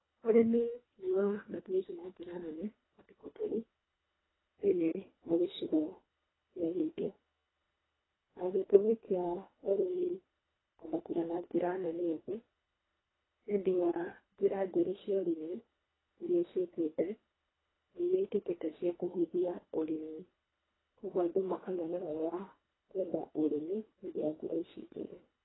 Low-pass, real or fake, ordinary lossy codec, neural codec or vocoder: 7.2 kHz; fake; AAC, 16 kbps; codec, 24 kHz, 1.5 kbps, HILCodec